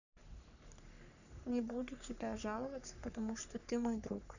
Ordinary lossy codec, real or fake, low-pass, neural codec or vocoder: none; fake; 7.2 kHz; codec, 44.1 kHz, 3.4 kbps, Pupu-Codec